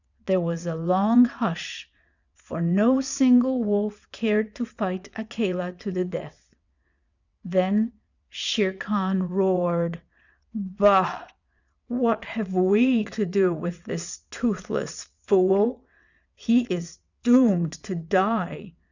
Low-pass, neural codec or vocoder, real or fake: 7.2 kHz; vocoder, 22.05 kHz, 80 mel bands, WaveNeXt; fake